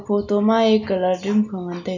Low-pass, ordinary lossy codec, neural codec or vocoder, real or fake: 7.2 kHz; none; none; real